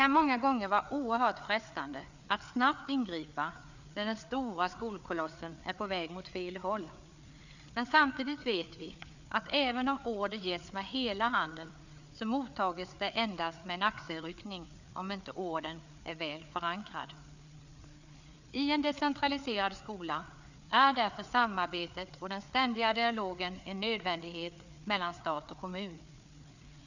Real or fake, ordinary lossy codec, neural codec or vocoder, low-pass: fake; none; codec, 16 kHz, 4 kbps, FreqCodec, larger model; 7.2 kHz